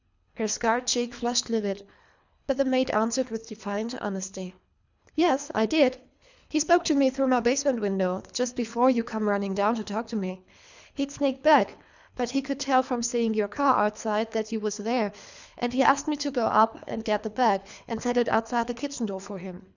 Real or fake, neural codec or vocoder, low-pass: fake; codec, 24 kHz, 3 kbps, HILCodec; 7.2 kHz